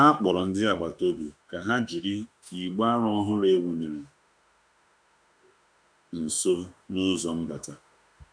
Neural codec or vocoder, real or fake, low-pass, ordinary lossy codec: autoencoder, 48 kHz, 32 numbers a frame, DAC-VAE, trained on Japanese speech; fake; 9.9 kHz; none